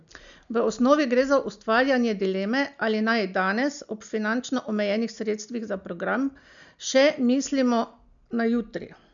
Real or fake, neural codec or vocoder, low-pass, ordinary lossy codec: real; none; 7.2 kHz; none